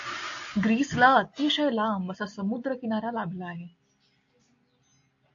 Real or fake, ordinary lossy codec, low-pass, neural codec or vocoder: real; AAC, 48 kbps; 7.2 kHz; none